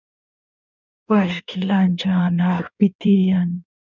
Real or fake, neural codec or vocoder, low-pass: fake; codec, 16 kHz in and 24 kHz out, 1.1 kbps, FireRedTTS-2 codec; 7.2 kHz